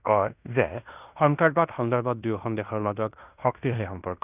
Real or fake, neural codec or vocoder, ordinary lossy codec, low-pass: fake; codec, 16 kHz in and 24 kHz out, 0.9 kbps, LongCat-Audio-Codec, fine tuned four codebook decoder; none; 3.6 kHz